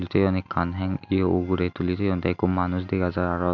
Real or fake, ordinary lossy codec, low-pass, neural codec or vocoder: real; none; 7.2 kHz; none